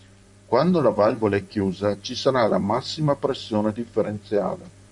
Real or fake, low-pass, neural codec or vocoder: fake; 10.8 kHz; vocoder, 44.1 kHz, 128 mel bands, Pupu-Vocoder